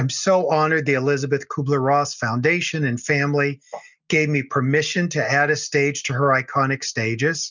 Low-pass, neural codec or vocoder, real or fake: 7.2 kHz; none; real